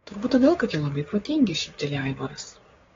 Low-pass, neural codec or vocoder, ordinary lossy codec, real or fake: 7.2 kHz; codec, 16 kHz, 6 kbps, DAC; AAC, 24 kbps; fake